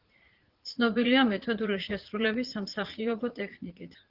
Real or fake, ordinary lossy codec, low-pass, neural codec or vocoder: fake; Opus, 16 kbps; 5.4 kHz; vocoder, 44.1 kHz, 80 mel bands, Vocos